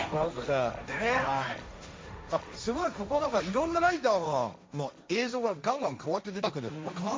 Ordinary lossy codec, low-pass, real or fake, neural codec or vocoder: none; none; fake; codec, 16 kHz, 1.1 kbps, Voila-Tokenizer